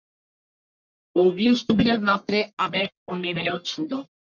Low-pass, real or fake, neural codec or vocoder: 7.2 kHz; fake; codec, 44.1 kHz, 1.7 kbps, Pupu-Codec